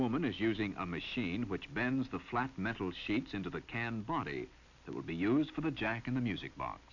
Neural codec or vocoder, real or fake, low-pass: none; real; 7.2 kHz